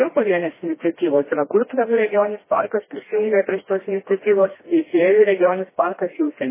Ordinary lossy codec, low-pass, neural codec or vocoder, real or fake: MP3, 16 kbps; 3.6 kHz; codec, 16 kHz, 1 kbps, FreqCodec, smaller model; fake